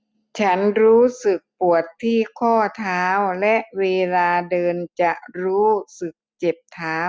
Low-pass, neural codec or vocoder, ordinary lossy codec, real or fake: none; none; none; real